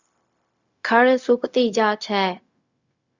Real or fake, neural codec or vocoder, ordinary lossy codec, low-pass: fake; codec, 16 kHz, 0.4 kbps, LongCat-Audio-Codec; Opus, 64 kbps; 7.2 kHz